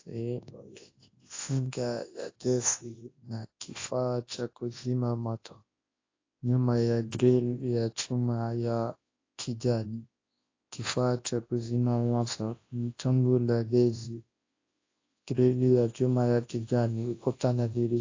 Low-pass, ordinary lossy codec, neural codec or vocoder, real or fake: 7.2 kHz; AAC, 32 kbps; codec, 24 kHz, 0.9 kbps, WavTokenizer, large speech release; fake